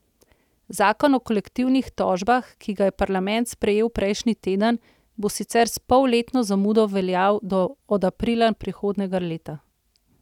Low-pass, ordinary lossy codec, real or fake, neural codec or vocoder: 19.8 kHz; none; real; none